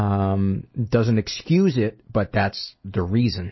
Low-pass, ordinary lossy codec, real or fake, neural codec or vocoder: 7.2 kHz; MP3, 24 kbps; real; none